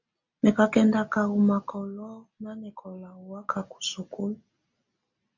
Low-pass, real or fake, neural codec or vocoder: 7.2 kHz; real; none